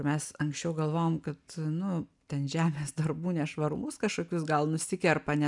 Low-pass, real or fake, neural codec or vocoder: 10.8 kHz; real; none